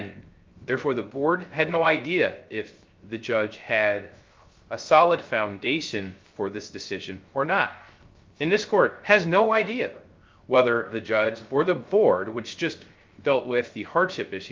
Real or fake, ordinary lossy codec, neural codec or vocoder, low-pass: fake; Opus, 32 kbps; codec, 16 kHz, 0.3 kbps, FocalCodec; 7.2 kHz